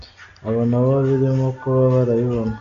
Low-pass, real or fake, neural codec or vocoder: 7.2 kHz; real; none